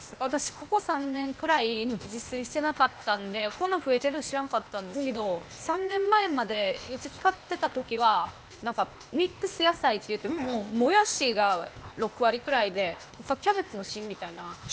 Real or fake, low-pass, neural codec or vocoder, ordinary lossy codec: fake; none; codec, 16 kHz, 0.8 kbps, ZipCodec; none